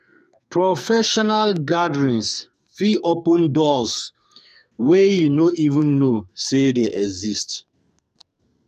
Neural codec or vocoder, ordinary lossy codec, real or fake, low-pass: codec, 44.1 kHz, 2.6 kbps, SNAC; none; fake; 14.4 kHz